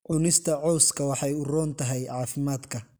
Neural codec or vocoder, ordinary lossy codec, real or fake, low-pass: none; none; real; none